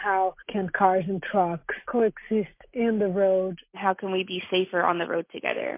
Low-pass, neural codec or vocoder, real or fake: 3.6 kHz; none; real